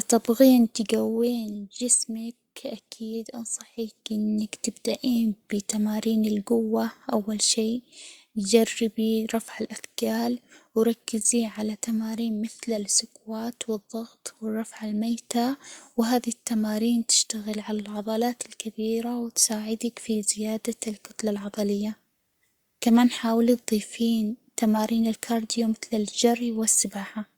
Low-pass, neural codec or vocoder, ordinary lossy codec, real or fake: 19.8 kHz; codec, 44.1 kHz, 7.8 kbps, Pupu-Codec; Opus, 64 kbps; fake